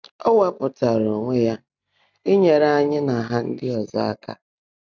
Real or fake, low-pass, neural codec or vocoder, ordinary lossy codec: real; 7.2 kHz; none; none